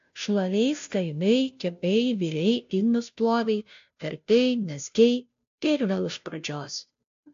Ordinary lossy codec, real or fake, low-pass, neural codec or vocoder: AAC, 64 kbps; fake; 7.2 kHz; codec, 16 kHz, 0.5 kbps, FunCodec, trained on Chinese and English, 25 frames a second